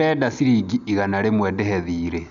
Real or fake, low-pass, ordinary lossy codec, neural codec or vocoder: real; 7.2 kHz; none; none